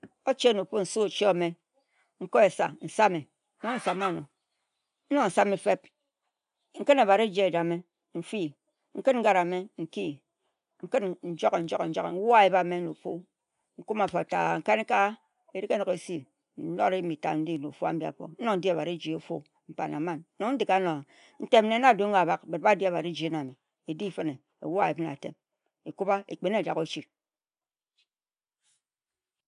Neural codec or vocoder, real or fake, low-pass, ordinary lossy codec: none; real; 10.8 kHz; none